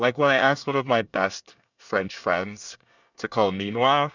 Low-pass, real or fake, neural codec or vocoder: 7.2 kHz; fake; codec, 24 kHz, 1 kbps, SNAC